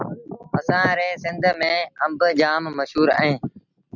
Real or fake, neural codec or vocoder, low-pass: real; none; 7.2 kHz